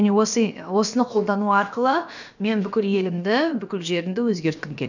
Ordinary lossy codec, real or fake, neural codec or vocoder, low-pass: none; fake; codec, 16 kHz, about 1 kbps, DyCAST, with the encoder's durations; 7.2 kHz